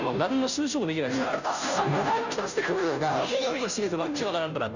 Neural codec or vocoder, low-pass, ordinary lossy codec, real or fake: codec, 16 kHz, 0.5 kbps, FunCodec, trained on Chinese and English, 25 frames a second; 7.2 kHz; none; fake